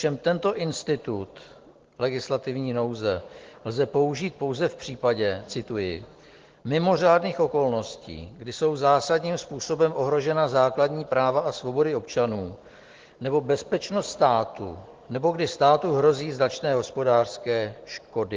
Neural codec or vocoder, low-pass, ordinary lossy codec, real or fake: none; 7.2 kHz; Opus, 16 kbps; real